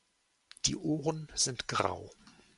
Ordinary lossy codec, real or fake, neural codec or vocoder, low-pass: MP3, 64 kbps; real; none; 10.8 kHz